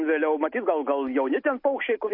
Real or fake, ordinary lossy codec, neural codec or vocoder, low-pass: real; MP3, 32 kbps; none; 5.4 kHz